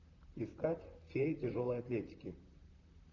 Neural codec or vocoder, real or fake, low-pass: vocoder, 44.1 kHz, 128 mel bands, Pupu-Vocoder; fake; 7.2 kHz